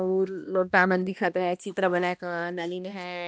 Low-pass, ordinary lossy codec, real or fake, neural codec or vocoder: none; none; fake; codec, 16 kHz, 1 kbps, X-Codec, HuBERT features, trained on balanced general audio